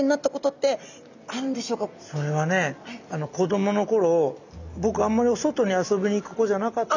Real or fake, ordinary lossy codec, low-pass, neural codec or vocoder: real; none; 7.2 kHz; none